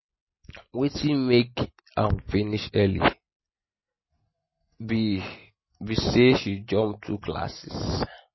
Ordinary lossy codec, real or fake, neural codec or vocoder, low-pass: MP3, 24 kbps; real; none; 7.2 kHz